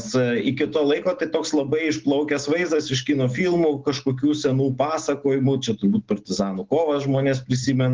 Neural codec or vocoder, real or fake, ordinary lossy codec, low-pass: none; real; Opus, 16 kbps; 7.2 kHz